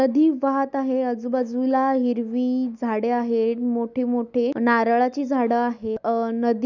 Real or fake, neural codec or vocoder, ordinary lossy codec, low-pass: real; none; none; 7.2 kHz